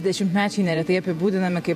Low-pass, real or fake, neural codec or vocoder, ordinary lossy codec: 14.4 kHz; fake; vocoder, 48 kHz, 128 mel bands, Vocos; MP3, 64 kbps